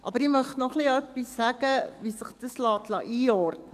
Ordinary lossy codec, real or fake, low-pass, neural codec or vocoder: none; fake; 14.4 kHz; codec, 44.1 kHz, 7.8 kbps, DAC